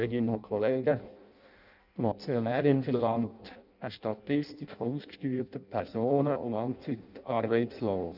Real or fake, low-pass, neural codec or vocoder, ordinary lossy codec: fake; 5.4 kHz; codec, 16 kHz in and 24 kHz out, 0.6 kbps, FireRedTTS-2 codec; none